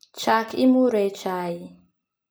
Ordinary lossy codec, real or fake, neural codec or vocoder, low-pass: none; real; none; none